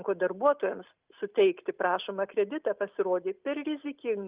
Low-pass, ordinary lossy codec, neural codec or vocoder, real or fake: 3.6 kHz; Opus, 24 kbps; none; real